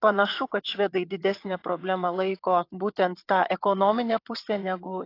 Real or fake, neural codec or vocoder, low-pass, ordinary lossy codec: fake; vocoder, 22.05 kHz, 80 mel bands, WaveNeXt; 5.4 kHz; AAC, 32 kbps